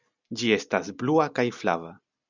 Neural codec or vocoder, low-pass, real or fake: none; 7.2 kHz; real